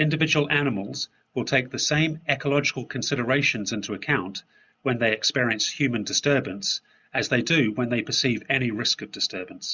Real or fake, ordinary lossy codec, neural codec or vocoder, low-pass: real; Opus, 64 kbps; none; 7.2 kHz